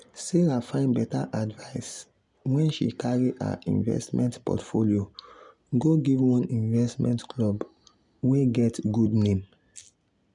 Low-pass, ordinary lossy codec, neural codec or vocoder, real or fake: 10.8 kHz; none; none; real